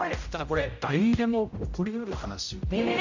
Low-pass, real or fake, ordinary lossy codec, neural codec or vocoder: 7.2 kHz; fake; none; codec, 16 kHz, 0.5 kbps, X-Codec, HuBERT features, trained on general audio